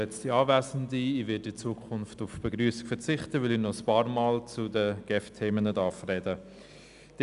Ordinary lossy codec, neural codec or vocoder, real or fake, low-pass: none; none; real; 10.8 kHz